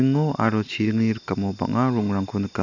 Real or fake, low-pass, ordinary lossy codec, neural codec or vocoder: real; 7.2 kHz; none; none